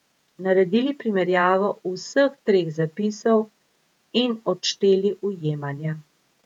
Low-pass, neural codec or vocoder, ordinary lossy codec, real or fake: 19.8 kHz; vocoder, 48 kHz, 128 mel bands, Vocos; none; fake